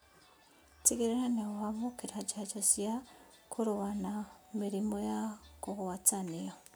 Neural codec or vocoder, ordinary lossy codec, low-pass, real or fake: none; none; none; real